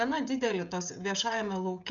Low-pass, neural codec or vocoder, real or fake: 7.2 kHz; codec, 16 kHz, 16 kbps, FreqCodec, smaller model; fake